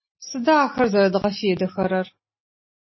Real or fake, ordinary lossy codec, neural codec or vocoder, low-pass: real; MP3, 24 kbps; none; 7.2 kHz